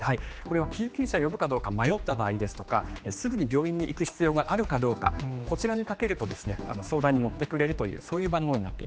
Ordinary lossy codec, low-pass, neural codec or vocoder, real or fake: none; none; codec, 16 kHz, 2 kbps, X-Codec, HuBERT features, trained on general audio; fake